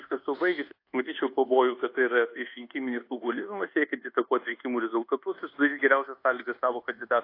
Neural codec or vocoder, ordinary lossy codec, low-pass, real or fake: codec, 24 kHz, 1.2 kbps, DualCodec; AAC, 32 kbps; 5.4 kHz; fake